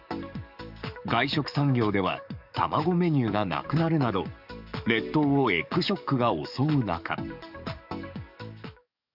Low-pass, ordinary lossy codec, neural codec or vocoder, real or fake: 5.4 kHz; none; codec, 44.1 kHz, 7.8 kbps, DAC; fake